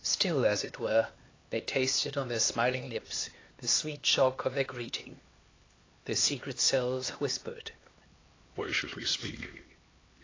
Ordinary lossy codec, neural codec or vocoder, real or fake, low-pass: AAC, 32 kbps; codec, 16 kHz, 2 kbps, X-Codec, HuBERT features, trained on LibriSpeech; fake; 7.2 kHz